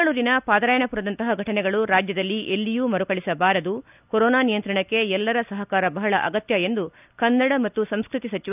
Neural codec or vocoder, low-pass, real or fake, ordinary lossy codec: none; 3.6 kHz; real; none